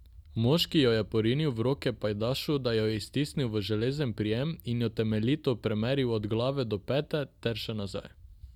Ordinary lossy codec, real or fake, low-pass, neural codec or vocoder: none; real; 19.8 kHz; none